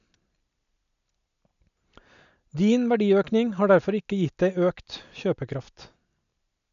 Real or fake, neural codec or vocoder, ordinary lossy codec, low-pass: real; none; MP3, 96 kbps; 7.2 kHz